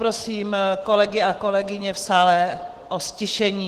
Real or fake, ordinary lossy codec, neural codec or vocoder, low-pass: fake; Opus, 16 kbps; autoencoder, 48 kHz, 128 numbers a frame, DAC-VAE, trained on Japanese speech; 14.4 kHz